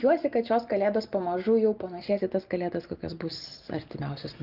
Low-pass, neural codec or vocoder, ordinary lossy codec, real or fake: 5.4 kHz; none; Opus, 24 kbps; real